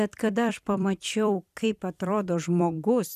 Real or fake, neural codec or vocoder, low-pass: fake; vocoder, 44.1 kHz, 128 mel bands every 256 samples, BigVGAN v2; 14.4 kHz